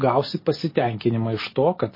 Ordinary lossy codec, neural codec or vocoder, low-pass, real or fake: MP3, 24 kbps; none; 5.4 kHz; real